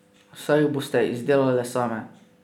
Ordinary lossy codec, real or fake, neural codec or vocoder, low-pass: none; real; none; 19.8 kHz